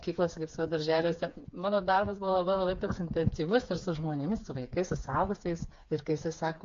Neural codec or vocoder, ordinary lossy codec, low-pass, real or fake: codec, 16 kHz, 4 kbps, FreqCodec, smaller model; AAC, 48 kbps; 7.2 kHz; fake